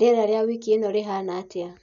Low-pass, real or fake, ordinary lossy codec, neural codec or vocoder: 7.2 kHz; real; none; none